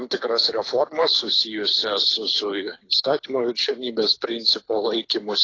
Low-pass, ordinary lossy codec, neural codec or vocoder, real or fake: 7.2 kHz; AAC, 32 kbps; codec, 24 kHz, 6 kbps, HILCodec; fake